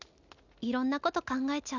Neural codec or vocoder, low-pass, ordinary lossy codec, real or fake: none; 7.2 kHz; none; real